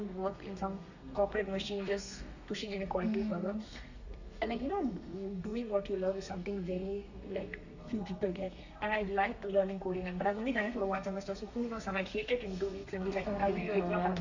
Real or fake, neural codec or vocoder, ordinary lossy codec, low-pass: fake; codec, 32 kHz, 1.9 kbps, SNAC; none; 7.2 kHz